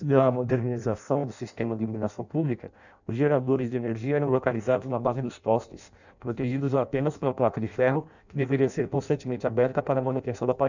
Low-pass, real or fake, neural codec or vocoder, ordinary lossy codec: 7.2 kHz; fake; codec, 16 kHz in and 24 kHz out, 0.6 kbps, FireRedTTS-2 codec; none